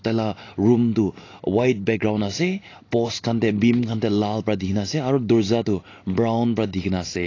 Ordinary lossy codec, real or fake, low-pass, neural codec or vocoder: AAC, 32 kbps; real; 7.2 kHz; none